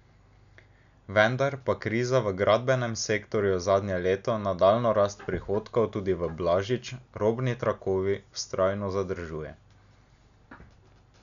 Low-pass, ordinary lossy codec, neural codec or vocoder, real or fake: 7.2 kHz; none; none; real